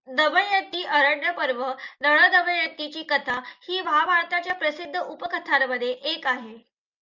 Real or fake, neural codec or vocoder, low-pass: real; none; 7.2 kHz